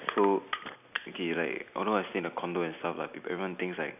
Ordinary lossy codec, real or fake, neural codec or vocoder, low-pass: none; real; none; 3.6 kHz